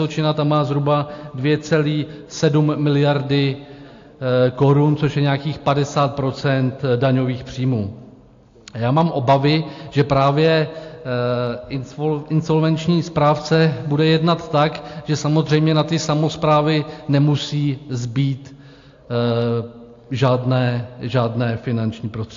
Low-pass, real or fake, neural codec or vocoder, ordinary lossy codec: 7.2 kHz; real; none; AAC, 48 kbps